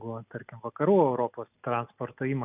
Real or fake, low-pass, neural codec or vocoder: real; 3.6 kHz; none